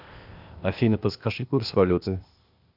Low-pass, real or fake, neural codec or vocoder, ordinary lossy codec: 5.4 kHz; fake; codec, 16 kHz, 0.8 kbps, ZipCodec; AAC, 48 kbps